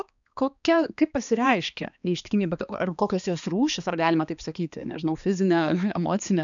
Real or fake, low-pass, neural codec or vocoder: fake; 7.2 kHz; codec, 16 kHz, 2 kbps, X-Codec, HuBERT features, trained on balanced general audio